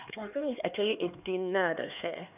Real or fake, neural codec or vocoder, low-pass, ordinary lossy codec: fake; codec, 16 kHz, 2 kbps, X-Codec, HuBERT features, trained on LibriSpeech; 3.6 kHz; none